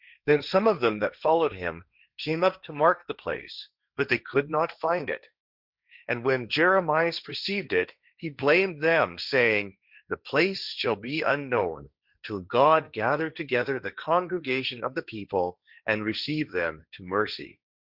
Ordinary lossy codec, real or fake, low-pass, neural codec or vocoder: Opus, 64 kbps; fake; 5.4 kHz; codec, 16 kHz, 1.1 kbps, Voila-Tokenizer